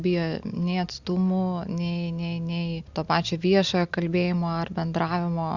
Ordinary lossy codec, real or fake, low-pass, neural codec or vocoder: Opus, 64 kbps; real; 7.2 kHz; none